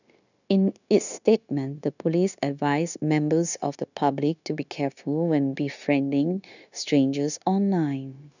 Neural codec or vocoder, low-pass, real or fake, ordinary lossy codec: codec, 16 kHz, 0.9 kbps, LongCat-Audio-Codec; 7.2 kHz; fake; none